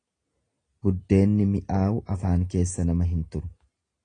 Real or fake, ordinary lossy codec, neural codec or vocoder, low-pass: real; AAC, 32 kbps; none; 9.9 kHz